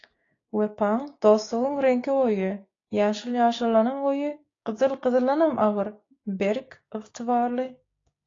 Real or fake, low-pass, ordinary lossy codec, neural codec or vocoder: fake; 7.2 kHz; AAC, 32 kbps; codec, 16 kHz, 6 kbps, DAC